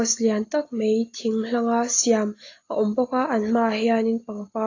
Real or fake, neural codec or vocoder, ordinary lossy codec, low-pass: real; none; AAC, 32 kbps; 7.2 kHz